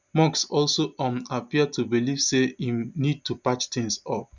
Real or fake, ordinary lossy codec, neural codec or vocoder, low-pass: real; none; none; 7.2 kHz